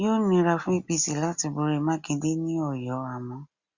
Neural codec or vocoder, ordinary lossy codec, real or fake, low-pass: none; Opus, 64 kbps; real; 7.2 kHz